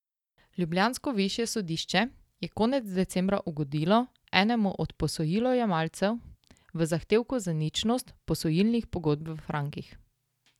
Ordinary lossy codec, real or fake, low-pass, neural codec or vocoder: none; real; 19.8 kHz; none